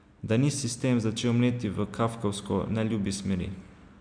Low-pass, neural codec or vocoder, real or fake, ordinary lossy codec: 9.9 kHz; none; real; none